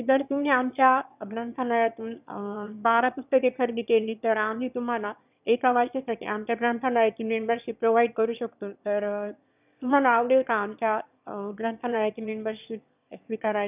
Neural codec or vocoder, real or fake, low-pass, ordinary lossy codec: autoencoder, 22.05 kHz, a latent of 192 numbers a frame, VITS, trained on one speaker; fake; 3.6 kHz; none